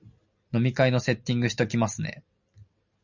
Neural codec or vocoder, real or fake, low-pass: none; real; 7.2 kHz